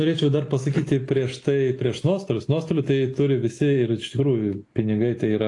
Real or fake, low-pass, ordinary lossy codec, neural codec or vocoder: real; 10.8 kHz; AAC, 48 kbps; none